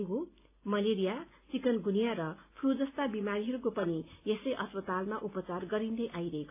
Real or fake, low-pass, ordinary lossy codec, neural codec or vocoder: real; 3.6 kHz; AAC, 32 kbps; none